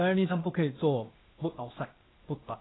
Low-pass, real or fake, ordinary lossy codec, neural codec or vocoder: 7.2 kHz; fake; AAC, 16 kbps; codec, 16 kHz, 0.8 kbps, ZipCodec